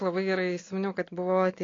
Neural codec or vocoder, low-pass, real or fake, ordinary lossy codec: none; 7.2 kHz; real; AAC, 32 kbps